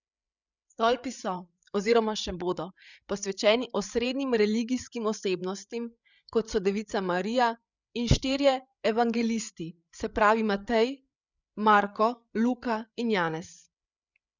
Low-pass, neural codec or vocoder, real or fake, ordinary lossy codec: 7.2 kHz; codec, 16 kHz, 8 kbps, FreqCodec, larger model; fake; none